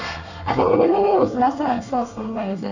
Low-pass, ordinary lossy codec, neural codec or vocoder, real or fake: 7.2 kHz; none; codec, 24 kHz, 1 kbps, SNAC; fake